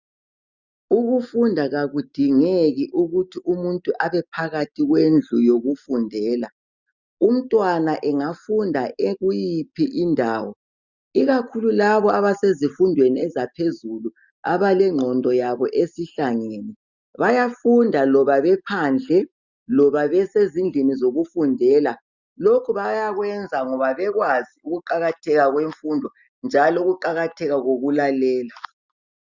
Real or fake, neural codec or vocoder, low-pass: real; none; 7.2 kHz